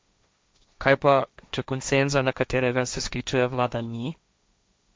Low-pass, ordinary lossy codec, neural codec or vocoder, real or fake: none; none; codec, 16 kHz, 1.1 kbps, Voila-Tokenizer; fake